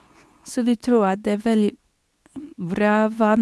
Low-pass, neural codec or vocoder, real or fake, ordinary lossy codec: none; codec, 24 kHz, 0.9 kbps, WavTokenizer, medium speech release version 2; fake; none